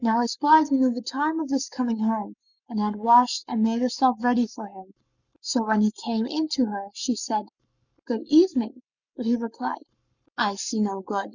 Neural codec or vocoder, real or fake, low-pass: codec, 44.1 kHz, 7.8 kbps, Pupu-Codec; fake; 7.2 kHz